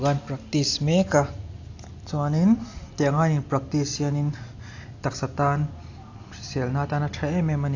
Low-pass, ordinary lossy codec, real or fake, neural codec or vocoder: 7.2 kHz; AAC, 48 kbps; real; none